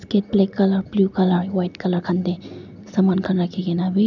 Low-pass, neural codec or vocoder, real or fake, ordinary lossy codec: 7.2 kHz; none; real; none